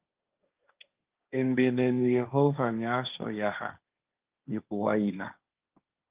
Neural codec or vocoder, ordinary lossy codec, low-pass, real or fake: codec, 16 kHz, 1.1 kbps, Voila-Tokenizer; Opus, 32 kbps; 3.6 kHz; fake